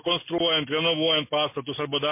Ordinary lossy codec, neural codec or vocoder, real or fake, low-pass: MP3, 24 kbps; none; real; 3.6 kHz